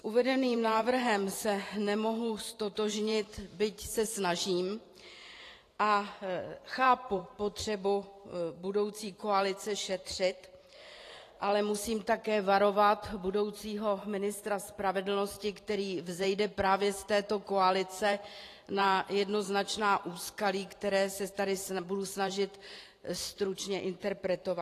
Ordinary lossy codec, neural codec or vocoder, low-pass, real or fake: AAC, 48 kbps; vocoder, 44.1 kHz, 128 mel bands every 512 samples, BigVGAN v2; 14.4 kHz; fake